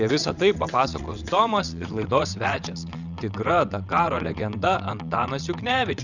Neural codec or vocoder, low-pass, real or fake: vocoder, 22.05 kHz, 80 mel bands, WaveNeXt; 7.2 kHz; fake